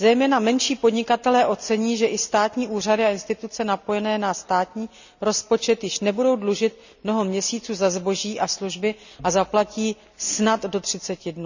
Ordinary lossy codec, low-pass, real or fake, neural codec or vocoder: none; 7.2 kHz; real; none